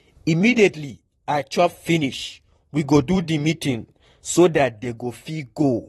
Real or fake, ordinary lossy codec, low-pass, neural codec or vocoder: fake; AAC, 32 kbps; 19.8 kHz; vocoder, 44.1 kHz, 128 mel bands, Pupu-Vocoder